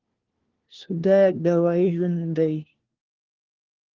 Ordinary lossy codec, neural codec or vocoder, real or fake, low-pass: Opus, 16 kbps; codec, 16 kHz, 1 kbps, FunCodec, trained on LibriTTS, 50 frames a second; fake; 7.2 kHz